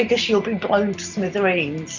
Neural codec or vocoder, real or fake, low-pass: none; real; 7.2 kHz